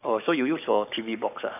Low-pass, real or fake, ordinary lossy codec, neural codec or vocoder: 3.6 kHz; fake; none; codec, 44.1 kHz, 7.8 kbps, Pupu-Codec